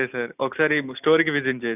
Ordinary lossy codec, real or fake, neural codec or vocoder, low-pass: none; real; none; 3.6 kHz